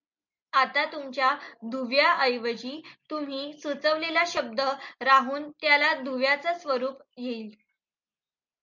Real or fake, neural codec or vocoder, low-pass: real; none; 7.2 kHz